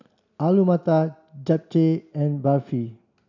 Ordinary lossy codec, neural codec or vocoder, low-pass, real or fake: none; none; 7.2 kHz; real